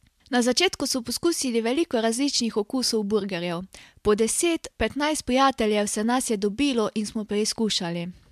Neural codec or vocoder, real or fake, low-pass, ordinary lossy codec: none; real; 14.4 kHz; MP3, 96 kbps